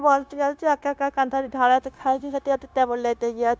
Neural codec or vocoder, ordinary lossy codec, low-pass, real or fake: codec, 16 kHz, 0.9 kbps, LongCat-Audio-Codec; none; none; fake